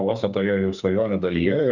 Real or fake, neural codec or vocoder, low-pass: fake; codec, 32 kHz, 1.9 kbps, SNAC; 7.2 kHz